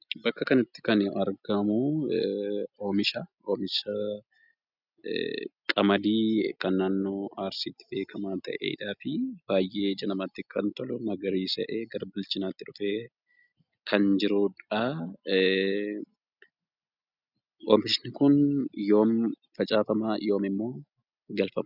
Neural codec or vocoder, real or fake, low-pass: none; real; 5.4 kHz